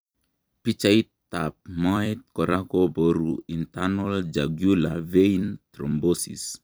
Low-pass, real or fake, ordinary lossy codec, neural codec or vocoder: none; fake; none; vocoder, 44.1 kHz, 128 mel bands every 256 samples, BigVGAN v2